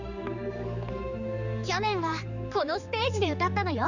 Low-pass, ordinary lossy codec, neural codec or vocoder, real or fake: 7.2 kHz; none; codec, 16 kHz, 4 kbps, X-Codec, HuBERT features, trained on general audio; fake